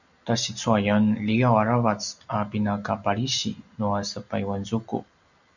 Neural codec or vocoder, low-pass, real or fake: none; 7.2 kHz; real